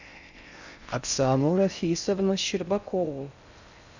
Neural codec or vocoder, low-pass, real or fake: codec, 16 kHz in and 24 kHz out, 0.6 kbps, FocalCodec, streaming, 4096 codes; 7.2 kHz; fake